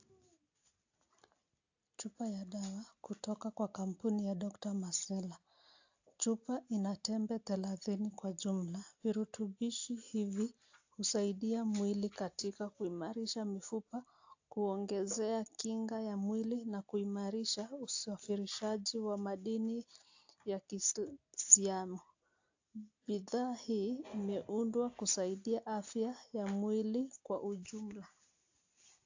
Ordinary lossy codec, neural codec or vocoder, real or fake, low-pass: AAC, 48 kbps; none; real; 7.2 kHz